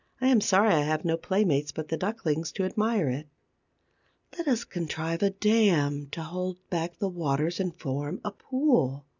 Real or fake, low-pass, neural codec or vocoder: real; 7.2 kHz; none